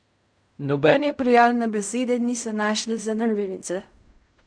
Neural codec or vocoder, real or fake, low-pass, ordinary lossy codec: codec, 16 kHz in and 24 kHz out, 0.4 kbps, LongCat-Audio-Codec, fine tuned four codebook decoder; fake; 9.9 kHz; none